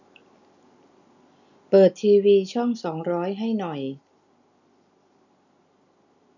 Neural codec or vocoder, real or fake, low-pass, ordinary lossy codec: none; real; 7.2 kHz; none